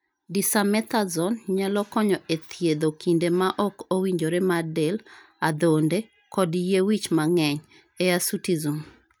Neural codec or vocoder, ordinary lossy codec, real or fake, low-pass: none; none; real; none